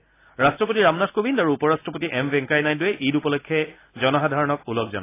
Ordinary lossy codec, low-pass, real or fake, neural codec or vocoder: AAC, 24 kbps; 3.6 kHz; real; none